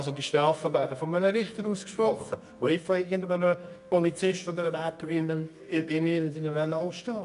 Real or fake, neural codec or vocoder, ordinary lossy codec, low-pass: fake; codec, 24 kHz, 0.9 kbps, WavTokenizer, medium music audio release; none; 10.8 kHz